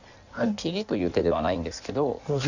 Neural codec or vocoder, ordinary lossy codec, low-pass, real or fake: codec, 16 kHz in and 24 kHz out, 1.1 kbps, FireRedTTS-2 codec; none; 7.2 kHz; fake